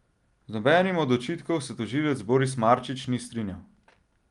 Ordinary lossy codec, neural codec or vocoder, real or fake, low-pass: Opus, 32 kbps; none; real; 10.8 kHz